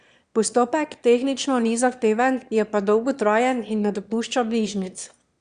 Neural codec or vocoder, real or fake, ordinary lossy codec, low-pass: autoencoder, 22.05 kHz, a latent of 192 numbers a frame, VITS, trained on one speaker; fake; Opus, 64 kbps; 9.9 kHz